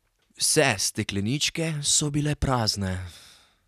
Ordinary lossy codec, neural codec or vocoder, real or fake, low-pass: none; none; real; 14.4 kHz